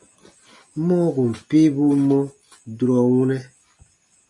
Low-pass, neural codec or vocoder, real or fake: 10.8 kHz; none; real